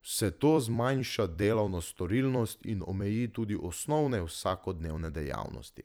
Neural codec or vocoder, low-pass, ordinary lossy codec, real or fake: vocoder, 44.1 kHz, 128 mel bands every 256 samples, BigVGAN v2; none; none; fake